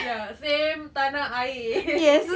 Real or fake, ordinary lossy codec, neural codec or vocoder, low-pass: real; none; none; none